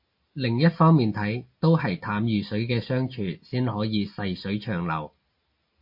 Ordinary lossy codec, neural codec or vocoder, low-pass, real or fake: MP3, 32 kbps; none; 5.4 kHz; real